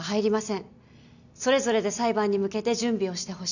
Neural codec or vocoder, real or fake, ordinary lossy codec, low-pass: none; real; none; 7.2 kHz